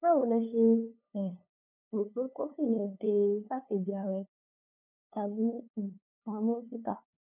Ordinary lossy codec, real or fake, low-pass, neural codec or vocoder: none; fake; 3.6 kHz; codec, 16 kHz, 2 kbps, FunCodec, trained on LibriTTS, 25 frames a second